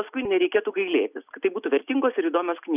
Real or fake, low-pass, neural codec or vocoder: real; 3.6 kHz; none